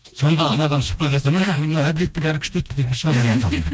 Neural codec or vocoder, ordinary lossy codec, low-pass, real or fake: codec, 16 kHz, 1 kbps, FreqCodec, smaller model; none; none; fake